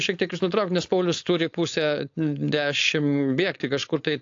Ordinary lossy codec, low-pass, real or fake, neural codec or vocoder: AAC, 48 kbps; 7.2 kHz; fake; codec, 16 kHz, 4.8 kbps, FACodec